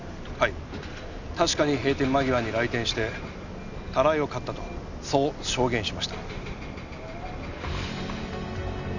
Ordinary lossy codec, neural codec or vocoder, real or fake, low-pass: none; none; real; 7.2 kHz